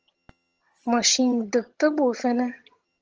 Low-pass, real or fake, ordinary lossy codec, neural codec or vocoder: 7.2 kHz; fake; Opus, 24 kbps; vocoder, 22.05 kHz, 80 mel bands, HiFi-GAN